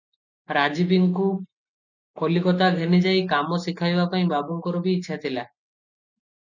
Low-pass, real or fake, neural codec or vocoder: 7.2 kHz; real; none